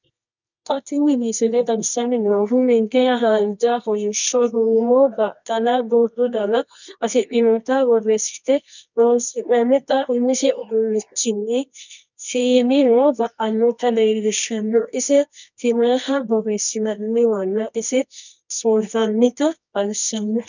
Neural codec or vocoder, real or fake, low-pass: codec, 24 kHz, 0.9 kbps, WavTokenizer, medium music audio release; fake; 7.2 kHz